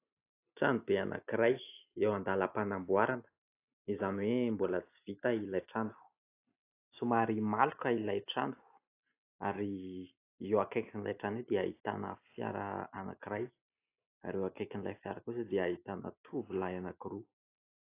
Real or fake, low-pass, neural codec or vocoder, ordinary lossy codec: real; 3.6 kHz; none; AAC, 24 kbps